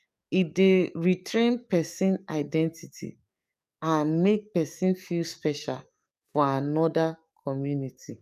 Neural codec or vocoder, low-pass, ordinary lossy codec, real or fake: codec, 44.1 kHz, 7.8 kbps, DAC; 14.4 kHz; none; fake